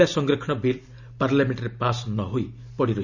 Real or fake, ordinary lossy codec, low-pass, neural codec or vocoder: real; none; 7.2 kHz; none